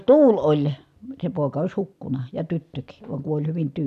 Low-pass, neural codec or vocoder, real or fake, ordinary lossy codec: 14.4 kHz; none; real; none